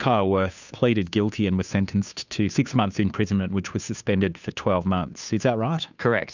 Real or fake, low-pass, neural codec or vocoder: fake; 7.2 kHz; codec, 16 kHz, 2 kbps, FunCodec, trained on Chinese and English, 25 frames a second